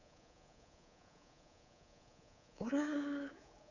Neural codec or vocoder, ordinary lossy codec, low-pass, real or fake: codec, 24 kHz, 3.1 kbps, DualCodec; none; 7.2 kHz; fake